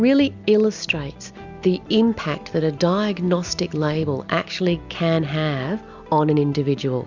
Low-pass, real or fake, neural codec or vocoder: 7.2 kHz; real; none